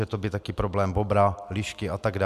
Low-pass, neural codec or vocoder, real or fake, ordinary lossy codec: 14.4 kHz; none; real; MP3, 96 kbps